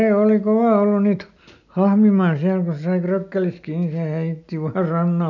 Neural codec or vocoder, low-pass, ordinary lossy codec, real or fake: none; 7.2 kHz; none; real